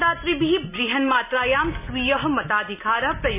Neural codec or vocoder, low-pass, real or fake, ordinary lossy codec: none; 3.6 kHz; real; none